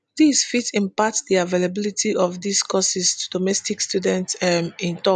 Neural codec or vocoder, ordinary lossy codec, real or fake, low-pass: none; none; real; 9.9 kHz